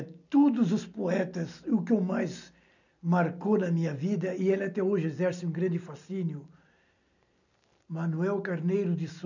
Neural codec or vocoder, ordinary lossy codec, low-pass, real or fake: none; none; 7.2 kHz; real